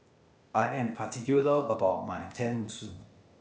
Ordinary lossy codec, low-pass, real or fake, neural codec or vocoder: none; none; fake; codec, 16 kHz, 0.8 kbps, ZipCodec